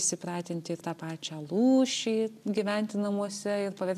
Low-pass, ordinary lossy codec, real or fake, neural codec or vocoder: 14.4 kHz; AAC, 96 kbps; real; none